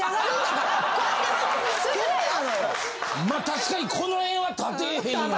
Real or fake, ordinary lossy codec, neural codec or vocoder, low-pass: real; none; none; none